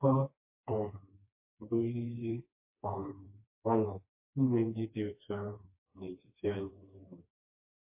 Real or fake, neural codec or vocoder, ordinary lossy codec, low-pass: fake; codec, 16 kHz, 2 kbps, FreqCodec, smaller model; MP3, 24 kbps; 3.6 kHz